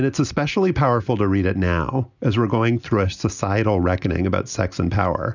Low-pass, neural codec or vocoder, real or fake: 7.2 kHz; none; real